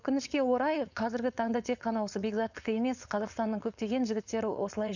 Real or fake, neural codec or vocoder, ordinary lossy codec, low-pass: fake; codec, 16 kHz, 4.8 kbps, FACodec; none; 7.2 kHz